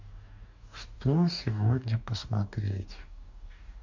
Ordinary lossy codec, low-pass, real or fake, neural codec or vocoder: none; 7.2 kHz; fake; codec, 44.1 kHz, 2.6 kbps, DAC